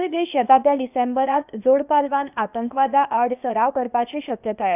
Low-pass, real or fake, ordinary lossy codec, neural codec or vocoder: 3.6 kHz; fake; none; codec, 16 kHz, 0.8 kbps, ZipCodec